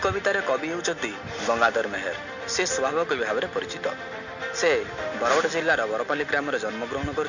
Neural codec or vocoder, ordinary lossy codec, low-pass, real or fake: none; none; 7.2 kHz; real